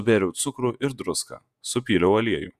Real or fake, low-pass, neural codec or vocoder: fake; 14.4 kHz; vocoder, 48 kHz, 128 mel bands, Vocos